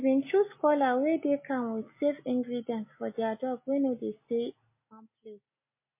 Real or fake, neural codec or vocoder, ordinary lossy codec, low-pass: real; none; MP3, 24 kbps; 3.6 kHz